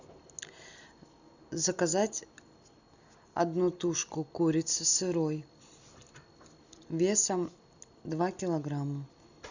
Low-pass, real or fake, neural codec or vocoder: 7.2 kHz; real; none